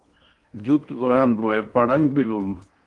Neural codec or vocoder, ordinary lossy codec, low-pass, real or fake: codec, 16 kHz in and 24 kHz out, 0.8 kbps, FocalCodec, streaming, 65536 codes; Opus, 24 kbps; 10.8 kHz; fake